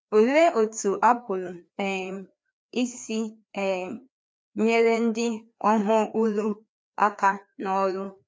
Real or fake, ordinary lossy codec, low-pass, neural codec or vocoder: fake; none; none; codec, 16 kHz, 2 kbps, FreqCodec, larger model